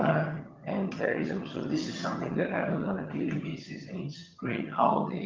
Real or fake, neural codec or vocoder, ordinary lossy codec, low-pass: fake; vocoder, 22.05 kHz, 80 mel bands, HiFi-GAN; Opus, 32 kbps; 7.2 kHz